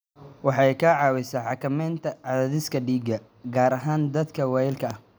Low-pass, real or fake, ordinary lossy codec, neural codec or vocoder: none; real; none; none